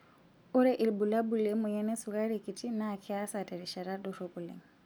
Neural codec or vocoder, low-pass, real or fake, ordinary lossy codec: none; none; real; none